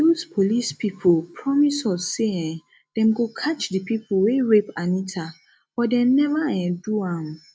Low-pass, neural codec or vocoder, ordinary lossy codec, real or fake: none; none; none; real